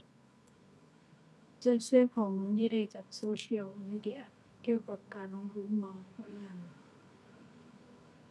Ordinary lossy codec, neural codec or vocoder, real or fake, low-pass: none; codec, 24 kHz, 0.9 kbps, WavTokenizer, medium music audio release; fake; none